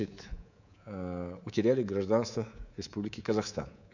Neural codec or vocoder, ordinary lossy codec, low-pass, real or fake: codec, 24 kHz, 3.1 kbps, DualCodec; none; 7.2 kHz; fake